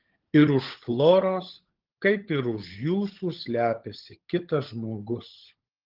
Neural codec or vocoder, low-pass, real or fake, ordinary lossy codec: codec, 16 kHz, 16 kbps, FunCodec, trained on LibriTTS, 50 frames a second; 5.4 kHz; fake; Opus, 16 kbps